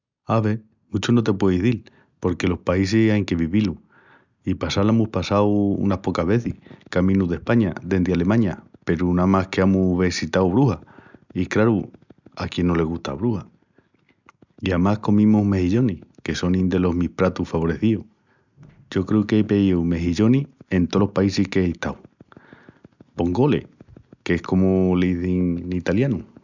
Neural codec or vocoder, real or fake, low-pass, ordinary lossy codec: none; real; 7.2 kHz; none